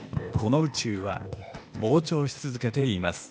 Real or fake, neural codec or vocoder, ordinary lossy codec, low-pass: fake; codec, 16 kHz, 0.8 kbps, ZipCodec; none; none